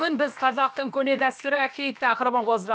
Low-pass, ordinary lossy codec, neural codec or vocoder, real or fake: none; none; codec, 16 kHz, about 1 kbps, DyCAST, with the encoder's durations; fake